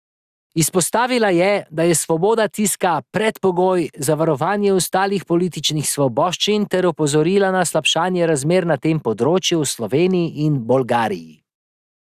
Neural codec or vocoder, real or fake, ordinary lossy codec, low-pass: none; real; Opus, 64 kbps; 14.4 kHz